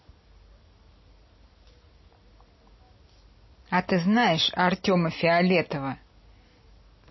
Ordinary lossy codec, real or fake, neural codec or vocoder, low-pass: MP3, 24 kbps; real; none; 7.2 kHz